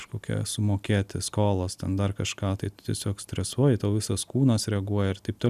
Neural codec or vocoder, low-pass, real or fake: none; 14.4 kHz; real